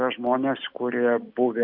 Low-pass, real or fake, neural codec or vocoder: 5.4 kHz; real; none